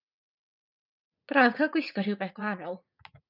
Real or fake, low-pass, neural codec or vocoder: fake; 5.4 kHz; vocoder, 44.1 kHz, 80 mel bands, Vocos